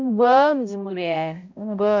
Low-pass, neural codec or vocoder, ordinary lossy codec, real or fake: 7.2 kHz; codec, 16 kHz, 0.5 kbps, X-Codec, HuBERT features, trained on balanced general audio; MP3, 64 kbps; fake